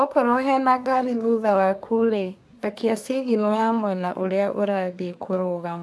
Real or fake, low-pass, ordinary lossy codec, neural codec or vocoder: fake; none; none; codec, 24 kHz, 1 kbps, SNAC